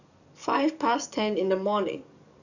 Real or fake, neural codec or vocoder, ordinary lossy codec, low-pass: fake; codec, 44.1 kHz, 7.8 kbps, DAC; none; 7.2 kHz